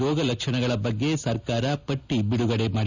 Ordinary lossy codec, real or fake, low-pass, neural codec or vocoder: none; real; 7.2 kHz; none